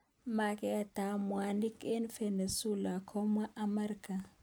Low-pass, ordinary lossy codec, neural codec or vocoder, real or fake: none; none; none; real